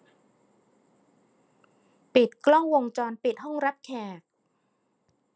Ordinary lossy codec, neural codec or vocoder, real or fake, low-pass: none; none; real; none